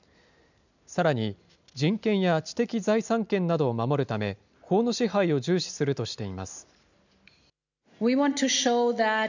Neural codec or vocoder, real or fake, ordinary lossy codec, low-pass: none; real; none; 7.2 kHz